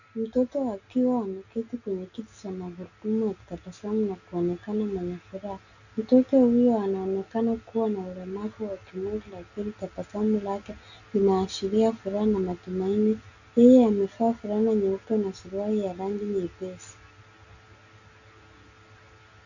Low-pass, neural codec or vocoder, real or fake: 7.2 kHz; none; real